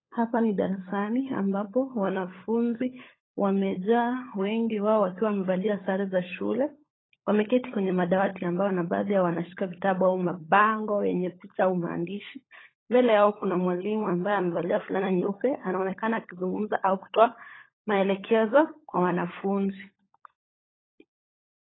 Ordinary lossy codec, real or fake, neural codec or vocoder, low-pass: AAC, 16 kbps; fake; codec, 16 kHz, 16 kbps, FunCodec, trained on LibriTTS, 50 frames a second; 7.2 kHz